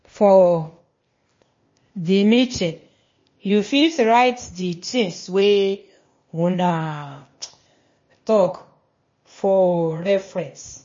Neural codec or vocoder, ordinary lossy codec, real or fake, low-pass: codec, 16 kHz, 0.8 kbps, ZipCodec; MP3, 32 kbps; fake; 7.2 kHz